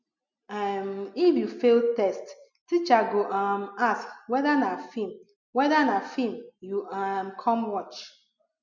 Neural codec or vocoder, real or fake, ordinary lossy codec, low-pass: none; real; none; 7.2 kHz